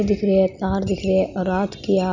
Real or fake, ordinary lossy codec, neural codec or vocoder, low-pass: real; none; none; 7.2 kHz